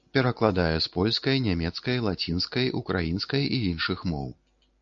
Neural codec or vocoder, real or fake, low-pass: none; real; 7.2 kHz